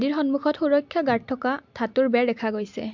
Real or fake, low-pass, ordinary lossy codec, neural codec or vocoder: real; 7.2 kHz; MP3, 64 kbps; none